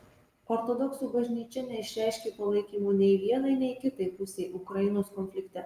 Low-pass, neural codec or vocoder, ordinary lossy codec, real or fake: 14.4 kHz; none; Opus, 32 kbps; real